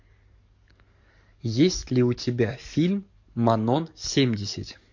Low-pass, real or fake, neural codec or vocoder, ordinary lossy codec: 7.2 kHz; fake; codec, 44.1 kHz, 7.8 kbps, DAC; MP3, 48 kbps